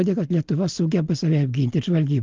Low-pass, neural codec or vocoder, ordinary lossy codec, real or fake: 7.2 kHz; none; Opus, 32 kbps; real